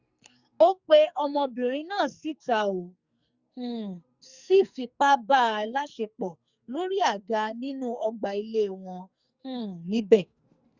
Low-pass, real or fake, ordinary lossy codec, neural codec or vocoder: 7.2 kHz; fake; Opus, 64 kbps; codec, 44.1 kHz, 2.6 kbps, SNAC